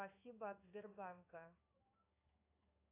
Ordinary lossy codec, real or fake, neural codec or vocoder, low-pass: AAC, 32 kbps; fake; codec, 16 kHz in and 24 kHz out, 1 kbps, XY-Tokenizer; 3.6 kHz